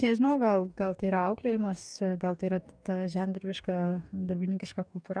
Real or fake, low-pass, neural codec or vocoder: fake; 9.9 kHz; codec, 44.1 kHz, 2.6 kbps, DAC